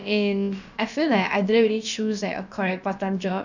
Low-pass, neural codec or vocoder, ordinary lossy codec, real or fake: 7.2 kHz; codec, 16 kHz, about 1 kbps, DyCAST, with the encoder's durations; none; fake